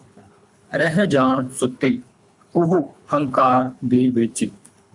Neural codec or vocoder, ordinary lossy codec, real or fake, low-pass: codec, 24 kHz, 3 kbps, HILCodec; MP3, 96 kbps; fake; 10.8 kHz